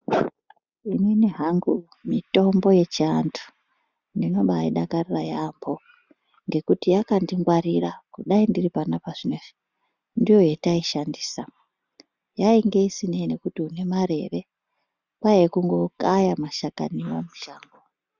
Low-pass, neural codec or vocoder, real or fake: 7.2 kHz; none; real